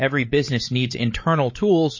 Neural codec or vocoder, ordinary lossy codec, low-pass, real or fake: codec, 16 kHz, 8 kbps, FreqCodec, larger model; MP3, 32 kbps; 7.2 kHz; fake